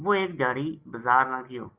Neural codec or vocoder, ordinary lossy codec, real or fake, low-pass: none; Opus, 24 kbps; real; 3.6 kHz